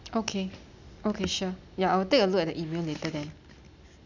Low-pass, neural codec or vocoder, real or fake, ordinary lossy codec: 7.2 kHz; none; real; none